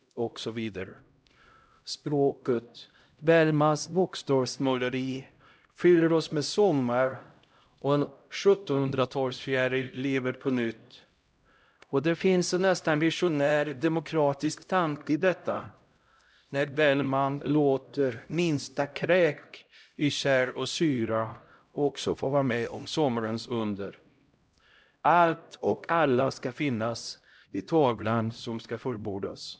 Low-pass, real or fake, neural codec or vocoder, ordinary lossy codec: none; fake; codec, 16 kHz, 0.5 kbps, X-Codec, HuBERT features, trained on LibriSpeech; none